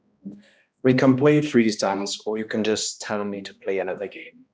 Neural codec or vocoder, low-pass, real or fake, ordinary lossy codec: codec, 16 kHz, 1 kbps, X-Codec, HuBERT features, trained on balanced general audio; none; fake; none